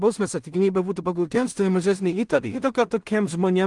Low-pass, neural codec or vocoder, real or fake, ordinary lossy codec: 10.8 kHz; codec, 16 kHz in and 24 kHz out, 0.4 kbps, LongCat-Audio-Codec, two codebook decoder; fake; Opus, 24 kbps